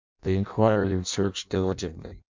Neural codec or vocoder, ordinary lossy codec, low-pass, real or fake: codec, 16 kHz in and 24 kHz out, 0.6 kbps, FireRedTTS-2 codec; MP3, 64 kbps; 7.2 kHz; fake